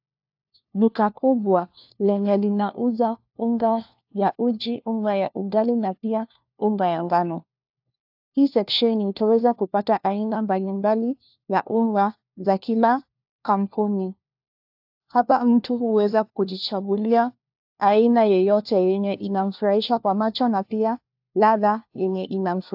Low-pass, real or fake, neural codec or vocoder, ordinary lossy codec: 5.4 kHz; fake; codec, 16 kHz, 1 kbps, FunCodec, trained on LibriTTS, 50 frames a second; AAC, 48 kbps